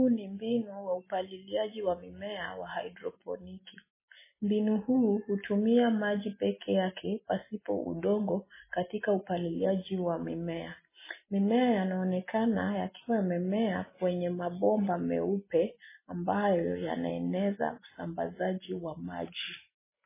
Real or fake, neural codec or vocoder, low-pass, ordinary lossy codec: real; none; 3.6 kHz; MP3, 16 kbps